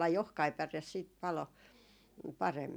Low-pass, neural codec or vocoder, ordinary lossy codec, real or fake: none; none; none; real